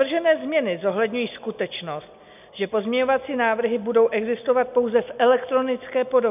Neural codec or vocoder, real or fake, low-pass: none; real; 3.6 kHz